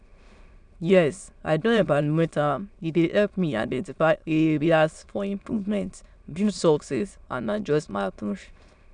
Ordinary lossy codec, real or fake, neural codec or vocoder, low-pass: none; fake; autoencoder, 22.05 kHz, a latent of 192 numbers a frame, VITS, trained on many speakers; 9.9 kHz